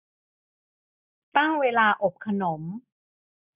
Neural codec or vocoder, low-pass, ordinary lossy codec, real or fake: none; 3.6 kHz; none; real